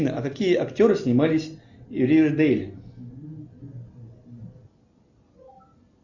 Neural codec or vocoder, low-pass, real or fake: none; 7.2 kHz; real